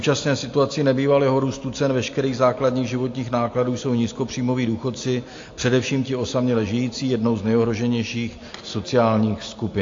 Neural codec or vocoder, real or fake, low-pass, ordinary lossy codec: none; real; 7.2 kHz; AAC, 48 kbps